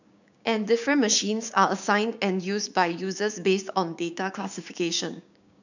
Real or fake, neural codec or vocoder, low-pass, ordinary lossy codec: fake; codec, 16 kHz, 6 kbps, DAC; 7.2 kHz; none